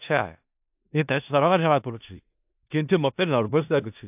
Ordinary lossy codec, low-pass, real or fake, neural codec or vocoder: AAC, 32 kbps; 3.6 kHz; fake; codec, 16 kHz in and 24 kHz out, 0.4 kbps, LongCat-Audio-Codec, four codebook decoder